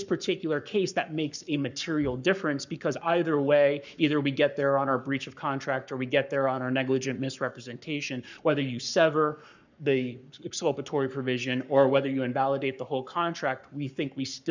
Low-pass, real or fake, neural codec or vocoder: 7.2 kHz; fake; codec, 44.1 kHz, 7.8 kbps, Pupu-Codec